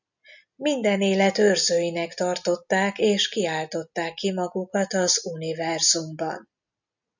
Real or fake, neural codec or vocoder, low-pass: real; none; 7.2 kHz